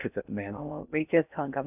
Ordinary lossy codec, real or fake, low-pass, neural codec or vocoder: none; fake; 3.6 kHz; codec, 16 kHz in and 24 kHz out, 0.6 kbps, FocalCodec, streaming, 2048 codes